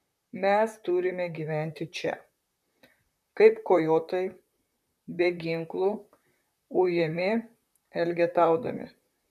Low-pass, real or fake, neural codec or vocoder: 14.4 kHz; fake; vocoder, 44.1 kHz, 128 mel bands, Pupu-Vocoder